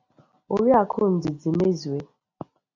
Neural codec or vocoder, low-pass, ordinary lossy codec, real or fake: none; 7.2 kHz; AAC, 48 kbps; real